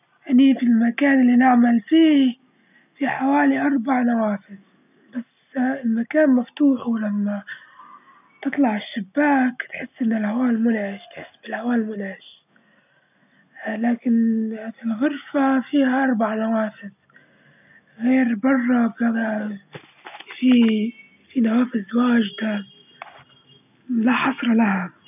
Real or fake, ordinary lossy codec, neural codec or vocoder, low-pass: real; none; none; 3.6 kHz